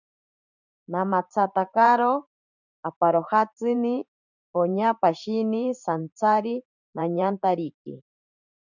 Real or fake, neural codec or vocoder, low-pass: fake; vocoder, 44.1 kHz, 128 mel bands every 512 samples, BigVGAN v2; 7.2 kHz